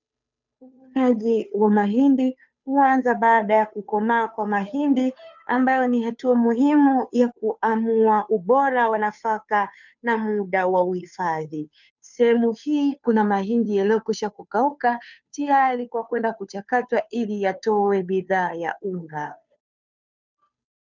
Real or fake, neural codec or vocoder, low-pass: fake; codec, 16 kHz, 2 kbps, FunCodec, trained on Chinese and English, 25 frames a second; 7.2 kHz